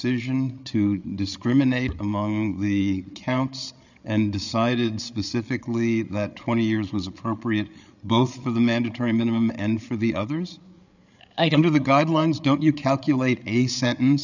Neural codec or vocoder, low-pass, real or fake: codec, 16 kHz, 8 kbps, FreqCodec, larger model; 7.2 kHz; fake